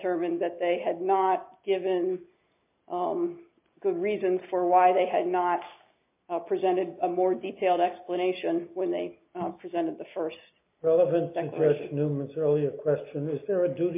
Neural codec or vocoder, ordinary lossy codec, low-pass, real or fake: none; MP3, 32 kbps; 3.6 kHz; real